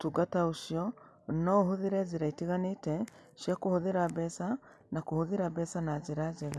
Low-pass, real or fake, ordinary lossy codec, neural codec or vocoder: none; real; none; none